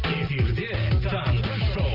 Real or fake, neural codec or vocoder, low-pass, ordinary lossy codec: real; none; 5.4 kHz; Opus, 32 kbps